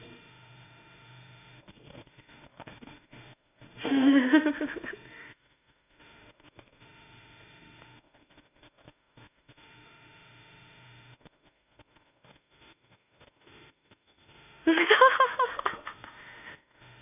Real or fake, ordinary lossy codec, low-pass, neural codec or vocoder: fake; none; 3.6 kHz; autoencoder, 48 kHz, 32 numbers a frame, DAC-VAE, trained on Japanese speech